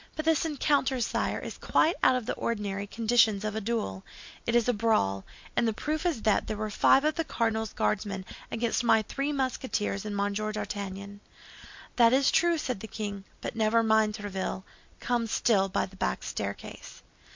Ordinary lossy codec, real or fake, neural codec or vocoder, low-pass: MP3, 48 kbps; real; none; 7.2 kHz